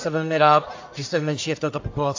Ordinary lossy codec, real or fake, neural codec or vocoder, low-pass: AAC, 48 kbps; fake; codec, 44.1 kHz, 1.7 kbps, Pupu-Codec; 7.2 kHz